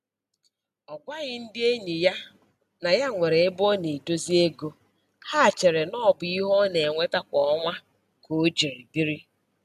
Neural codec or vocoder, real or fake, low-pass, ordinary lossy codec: none; real; 14.4 kHz; none